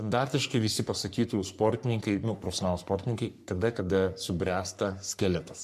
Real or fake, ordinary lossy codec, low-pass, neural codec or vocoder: fake; MP3, 96 kbps; 14.4 kHz; codec, 44.1 kHz, 3.4 kbps, Pupu-Codec